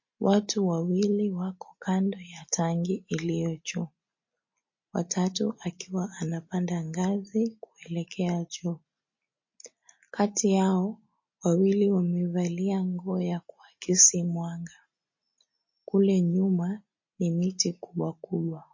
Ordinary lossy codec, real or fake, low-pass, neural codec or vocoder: MP3, 32 kbps; real; 7.2 kHz; none